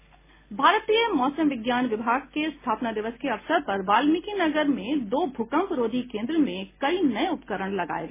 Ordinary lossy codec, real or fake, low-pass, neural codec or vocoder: MP3, 16 kbps; real; 3.6 kHz; none